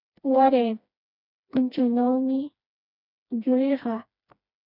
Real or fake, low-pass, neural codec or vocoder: fake; 5.4 kHz; codec, 16 kHz, 2 kbps, FreqCodec, smaller model